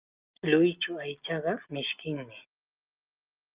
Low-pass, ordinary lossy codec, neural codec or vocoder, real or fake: 3.6 kHz; Opus, 24 kbps; none; real